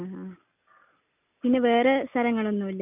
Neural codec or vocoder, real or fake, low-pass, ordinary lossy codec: none; real; 3.6 kHz; none